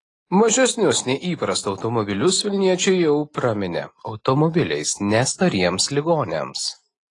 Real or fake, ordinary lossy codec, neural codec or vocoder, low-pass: real; AAC, 32 kbps; none; 10.8 kHz